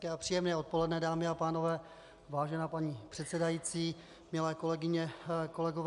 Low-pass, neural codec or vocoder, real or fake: 10.8 kHz; none; real